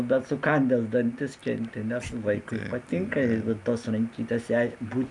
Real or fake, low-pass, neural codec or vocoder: real; 10.8 kHz; none